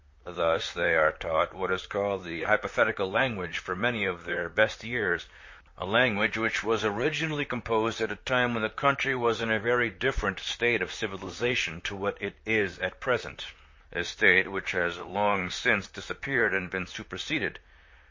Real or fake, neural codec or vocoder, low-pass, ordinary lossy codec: fake; vocoder, 44.1 kHz, 128 mel bands, Pupu-Vocoder; 7.2 kHz; MP3, 32 kbps